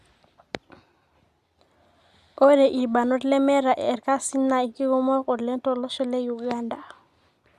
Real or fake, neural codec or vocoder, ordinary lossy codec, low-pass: real; none; Opus, 64 kbps; 14.4 kHz